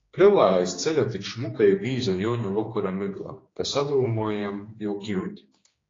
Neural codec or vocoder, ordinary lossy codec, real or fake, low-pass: codec, 16 kHz, 2 kbps, X-Codec, HuBERT features, trained on general audio; AAC, 32 kbps; fake; 7.2 kHz